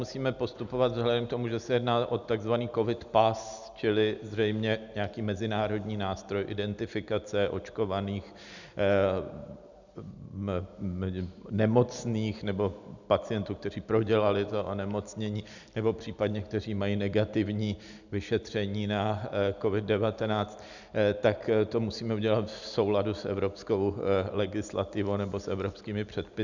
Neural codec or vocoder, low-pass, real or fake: none; 7.2 kHz; real